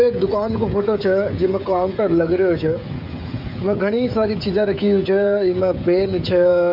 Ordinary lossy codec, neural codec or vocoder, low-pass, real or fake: MP3, 48 kbps; codec, 16 kHz, 16 kbps, FreqCodec, smaller model; 5.4 kHz; fake